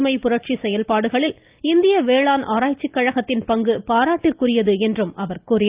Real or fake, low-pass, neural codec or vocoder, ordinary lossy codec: real; 3.6 kHz; none; Opus, 24 kbps